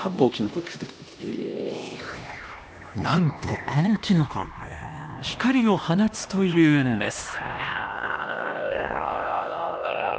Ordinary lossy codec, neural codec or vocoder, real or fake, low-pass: none; codec, 16 kHz, 1 kbps, X-Codec, HuBERT features, trained on LibriSpeech; fake; none